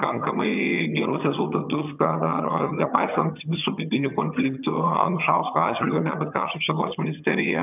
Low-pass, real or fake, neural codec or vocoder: 3.6 kHz; fake; vocoder, 22.05 kHz, 80 mel bands, HiFi-GAN